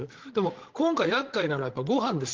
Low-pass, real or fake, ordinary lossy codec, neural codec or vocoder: 7.2 kHz; fake; Opus, 16 kbps; codec, 16 kHz, 16 kbps, FreqCodec, larger model